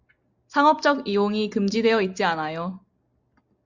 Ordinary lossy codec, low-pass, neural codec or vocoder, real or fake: Opus, 64 kbps; 7.2 kHz; none; real